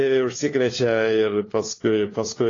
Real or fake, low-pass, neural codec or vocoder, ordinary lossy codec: fake; 7.2 kHz; codec, 16 kHz, 4 kbps, FunCodec, trained on LibriTTS, 50 frames a second; AAC, 32 kbps